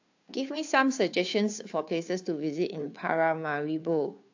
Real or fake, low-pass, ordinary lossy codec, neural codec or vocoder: fake; 7.2 kHz; AAC, 48 kbps; codec, 16 kHz, 2 kbps, FunCodec, trained on Chinese and English, 25 frames a second